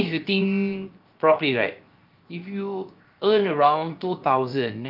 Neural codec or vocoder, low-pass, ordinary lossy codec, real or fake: codec, 16 kHz, 0.7 kbps, FocalCodec; 5.4 kHz; Opus, 24 kbps; fake